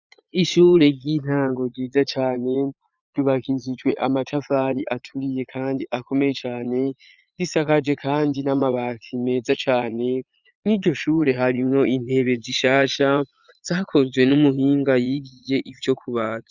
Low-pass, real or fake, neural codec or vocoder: 7.2 kHz; fake; vocoder, 22.05 kHz, 80 mel bands, Vocos